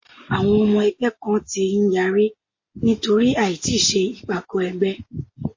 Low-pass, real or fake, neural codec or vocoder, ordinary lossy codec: 7.2 kHz; real; none; MP3, 32 kbps